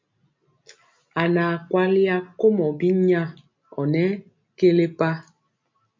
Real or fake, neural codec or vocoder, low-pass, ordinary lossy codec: real; none; 7.2 kHz; MP3, 48 kbps